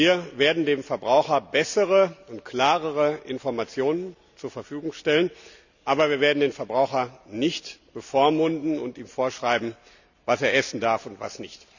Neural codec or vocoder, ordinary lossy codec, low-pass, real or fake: none; none; 7.2 kHz; real